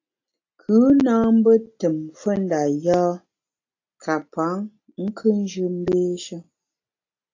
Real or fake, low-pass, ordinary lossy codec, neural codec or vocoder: real; 7.2 kHz; AAC, 48 kbps; none